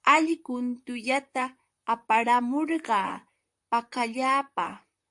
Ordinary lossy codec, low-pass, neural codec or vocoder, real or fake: AAC, 64 kbps; 10.8 kHz; vocoder, 44.1 kHz, 128 mel bands, Pupu-Vocoder; fake